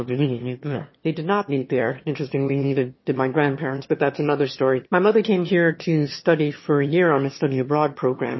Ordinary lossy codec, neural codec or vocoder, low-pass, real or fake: MP3, 24 kbps; autoencoder, 22.05 kHz, a latent of 192 numbers a frame, VITS, trained on one speaker; 7.2 kHz; fake